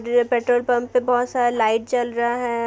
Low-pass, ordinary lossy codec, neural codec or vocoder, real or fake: none; none; none; real